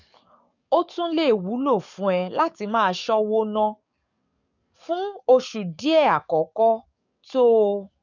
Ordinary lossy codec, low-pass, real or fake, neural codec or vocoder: none; 7.2 kHz; fake; codec, 44.1 kHz, 7.8 kbps, DAC